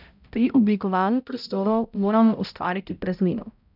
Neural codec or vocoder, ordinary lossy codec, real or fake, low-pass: codec, 16 kHz, 0.5 kbps, X-Codec, HuBERT features, trained on balanced general audio; none; fake; 5.4 kHz